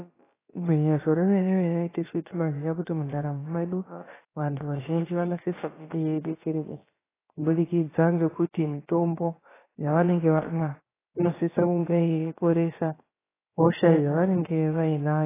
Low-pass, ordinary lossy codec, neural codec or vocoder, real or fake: 3.6 kHz; AAC, 16 kbps; codec, 16 kHz, about 1 kbps, DyCAST, with the encoder's durations; fake